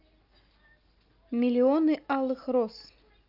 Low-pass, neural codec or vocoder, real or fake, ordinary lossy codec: 5.4 kHz; none; real; Opus, 24 kbps